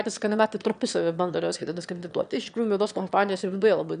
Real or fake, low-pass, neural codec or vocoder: fake; 9.9 kHz; autoencoder, 22.05 kHz, a latent of 192 numbers a frame, VITS, trained on one speaker